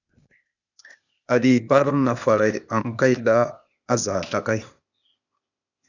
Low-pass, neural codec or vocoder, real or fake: 7.2 kHz; codec, 16 kHz, 0.8 kbps, ZipCodec; fake